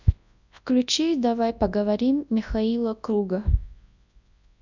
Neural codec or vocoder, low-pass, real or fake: codec, 24 kHz, 0.9 kbps, WavTokenizer, large speech release; 7.2 kHz; fake